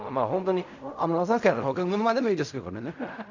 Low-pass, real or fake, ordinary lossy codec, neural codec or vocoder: 7.2 kHz; fake; none; codec, 16 kHz in and 24 kHz out, 0.4 kbps, LongCat-Audio-Codec, fine tuned four codebook decoder